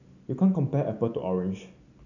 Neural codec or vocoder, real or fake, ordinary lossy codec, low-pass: none; real; none; 7.2 kHz